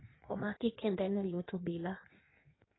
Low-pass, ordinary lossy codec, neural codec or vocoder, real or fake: 7.2 kHz; AAC, 16 kbps; codec, 16 kHz in and 24 kHz out, 1.1 kbps, FireRedTTS-2 codec; fake